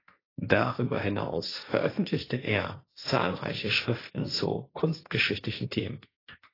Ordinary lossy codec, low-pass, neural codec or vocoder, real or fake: AAC, 24 kbps; 5.4 kHz; codec, 16 kHz, 1.1 kbps, Voila-Tokenizer; fake